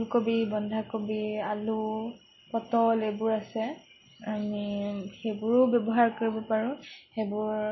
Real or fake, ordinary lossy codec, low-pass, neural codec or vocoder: real; MP3, 24 kbps; 7.2 kHz; none